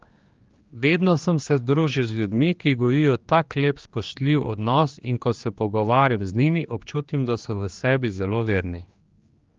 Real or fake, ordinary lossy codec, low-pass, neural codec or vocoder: fake; Opus, 32 kbps; 7.2 kHz; codec, 16 kHz, 2 kbps, X-Codec, HuBERT features, trained on general audio